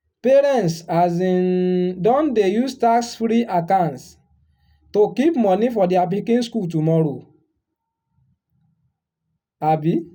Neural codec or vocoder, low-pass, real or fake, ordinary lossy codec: none; 19.8 kHz; real; none